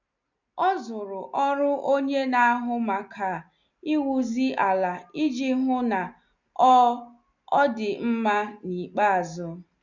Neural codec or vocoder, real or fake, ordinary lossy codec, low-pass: none; real; none; 7.2 kHz